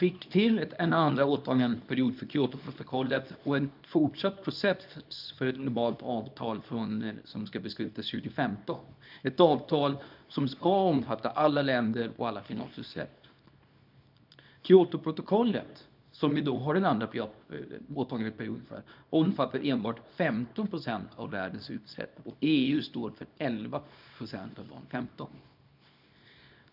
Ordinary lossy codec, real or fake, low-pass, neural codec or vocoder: none; fake; 5.4 kHz; codec, 24 kHz, 0.9 kbps, WavTokenizer, small release